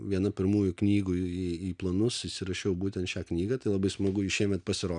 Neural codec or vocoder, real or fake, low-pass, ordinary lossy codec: none; real; 9.9 kHz; MP3, 96 kbps